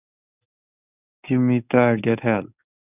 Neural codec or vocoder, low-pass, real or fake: codec, 24 kHz, 0.9 kbps, WavTokenizer, medium speech release version 1; 3.6 kHz; fake